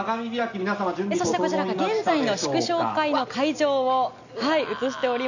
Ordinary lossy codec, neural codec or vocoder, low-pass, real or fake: none; none; 7.2 kHz; real